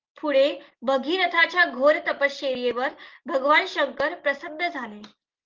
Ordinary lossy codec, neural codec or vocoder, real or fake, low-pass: Opus, 16 kbps; none; real; 7.2 kHz